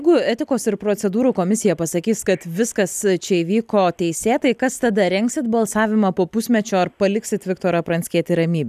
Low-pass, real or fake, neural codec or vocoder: 14.4 kHz; real; none